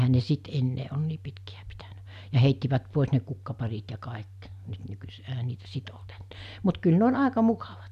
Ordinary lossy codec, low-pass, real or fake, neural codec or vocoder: none; 14.4 kHz; real; none